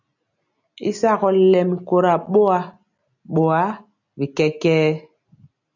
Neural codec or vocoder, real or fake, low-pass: none; real; 7.2 kHz